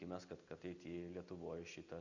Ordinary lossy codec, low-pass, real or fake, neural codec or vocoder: Opus, 64 kbps; 7.2 kHz; real; none